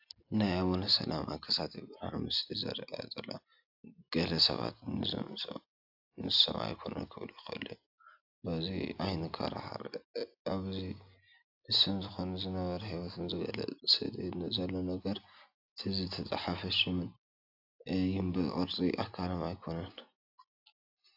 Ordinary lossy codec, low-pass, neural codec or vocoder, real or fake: AAC, 48 kbps; 5.4 kHz; none; real